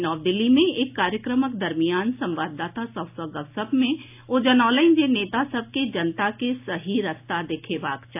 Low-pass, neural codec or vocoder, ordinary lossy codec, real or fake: 3.6 kHz; none; AAC, 32 kbps; real